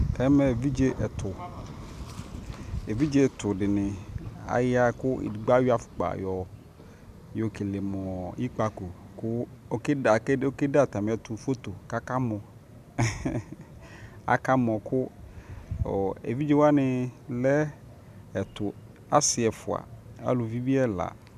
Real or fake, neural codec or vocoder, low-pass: real; none; 14.4 kHz